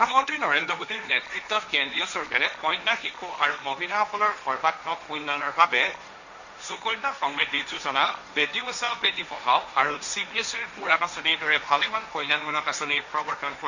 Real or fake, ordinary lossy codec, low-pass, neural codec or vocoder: fake; none; 7.2 kHz; codec, 16 kHz, 1.1 kbps, Voila-Tokenizer